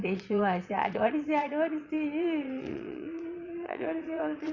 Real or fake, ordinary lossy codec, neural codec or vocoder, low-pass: fake; Opus, 64 kbps; vocoder, 22.05 kHz, 80 mel bands, Vocos; 7.2 kHz